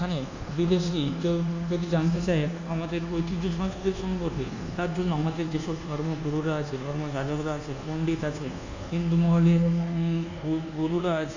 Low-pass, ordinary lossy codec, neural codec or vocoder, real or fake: 7.2 kHz; none; codec, 24 kHz, 1.2 kbps, DualCodec; fake